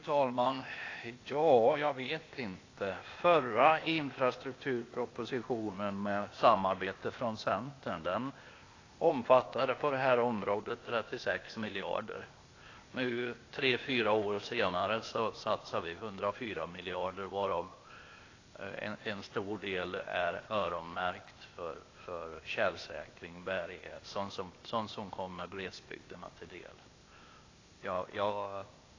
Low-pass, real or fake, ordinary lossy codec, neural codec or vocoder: 7.2 kHz; fake; AAC, 32 kbps; codec, 16 kHz, 0.8 kbps, ZipCodec